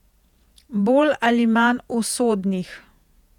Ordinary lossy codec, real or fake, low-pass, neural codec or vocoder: none; fake; 19.8 kHz; vocoder, 48 kHz, 128 mel bands, Vocos